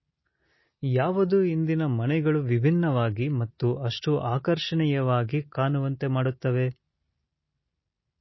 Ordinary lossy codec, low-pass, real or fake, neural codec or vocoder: MP3, 24 kbps; 7.2 kHz; real; none